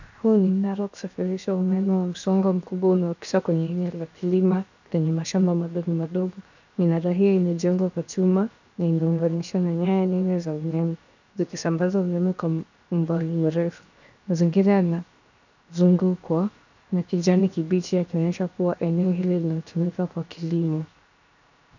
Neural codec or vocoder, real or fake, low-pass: codec, 16 kHz, 0.7 kbps, FocalCodec; fake; 7.2 kHz